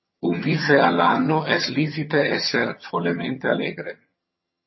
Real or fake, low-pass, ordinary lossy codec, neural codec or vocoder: fake; 7.2 kHz; MP3, 24 kbps; vocoder, 22.05 kHz, 80 mel bands, HiFi-GAN